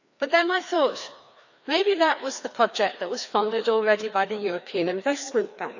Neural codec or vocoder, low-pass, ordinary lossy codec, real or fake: codec, 16 kHz, 2 kbps, FreqCodec, larger model; 7.2 kHz; none; fake